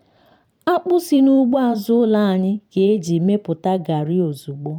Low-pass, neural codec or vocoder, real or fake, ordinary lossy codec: 19.8 kHz; vocoder, 44.1 kHz, 128 mel bands every 512 samples, BigVGAN v2; fake; none